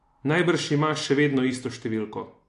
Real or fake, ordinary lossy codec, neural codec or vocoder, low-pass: real; AAC, 48 kbps; none; 10.8 kHz